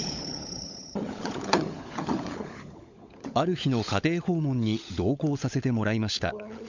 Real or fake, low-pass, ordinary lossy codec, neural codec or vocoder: fake; 7.2 kHz; none; codec, 16 kHz, 16 kbps, FunCodec, trained on LibriTTS, 50 frames a second